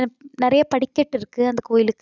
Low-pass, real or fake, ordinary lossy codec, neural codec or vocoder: 7.2 kHz; real; none; none